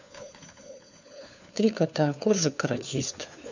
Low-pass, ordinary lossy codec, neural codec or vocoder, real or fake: 7.2 kHz; none; codec, 16 kHz, 4 kbps, FunCodec, trained on LibriTTS, 50 frames a second; fake